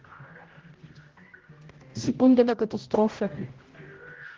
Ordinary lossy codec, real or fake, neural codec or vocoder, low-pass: Opus, 16 kbps; fake; codec, 16 kHz, 0.5 kbps, X-Codec, HuBERT features, trained on general audio; 7.2 kHz